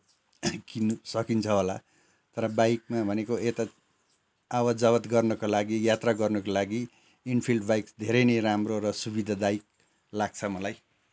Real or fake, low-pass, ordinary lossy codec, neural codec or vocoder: real; none; none; none